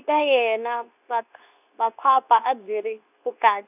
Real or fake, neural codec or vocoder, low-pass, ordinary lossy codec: fake; codec, 24 kHz, 0.9 kbps, WavTokenizer, medium speech release version 2; 3.6 kHz; none